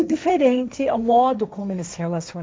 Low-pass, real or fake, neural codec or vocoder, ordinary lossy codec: none; fake; codec, 16 kHz, 1.1 kbps, Voila-Tokenizer; none